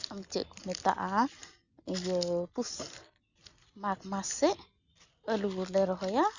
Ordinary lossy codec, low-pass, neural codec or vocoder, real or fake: Opus, 64 kbps; 7.2 kHz; none; real